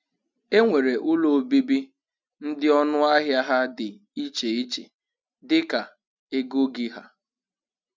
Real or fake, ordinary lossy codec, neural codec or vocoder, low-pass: real; none; none; none